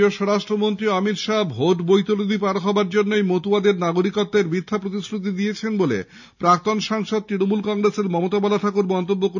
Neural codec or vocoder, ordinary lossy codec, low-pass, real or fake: none; none; 7.2 kHz; real